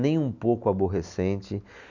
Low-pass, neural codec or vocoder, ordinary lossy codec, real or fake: 7.2 kHz; none; none; real